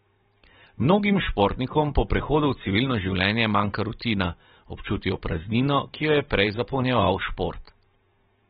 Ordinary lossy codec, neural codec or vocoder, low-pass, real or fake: AAC, 16 kbps; vocoder, 44.1 kHz, 128 mel bands every 512 samples, BigVGAN v2; 19.8 kHz; fake